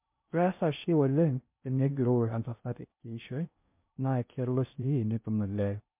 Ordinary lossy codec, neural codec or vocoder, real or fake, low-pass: MP3, 32 kbps; codec, 16 kHz in and 24 kHz out, 0.6 kbps, FocalCodec, streaming, 2048 codes; fake; 3.6 kHz